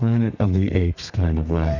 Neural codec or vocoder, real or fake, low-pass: codec, 32 kHz, 1.9 kbps, SNAC; fake; 7.2 kHz